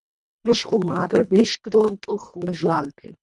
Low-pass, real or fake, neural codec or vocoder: 10.8 kHz; fake; codec, 24 kHz, 1.5 kbps, HILCodec